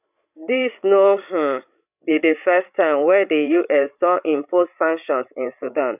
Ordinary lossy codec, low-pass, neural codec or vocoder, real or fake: none; 3.6 kHz; codec, 16 kHz, 16 kbps, FreqCodec, larger model; fake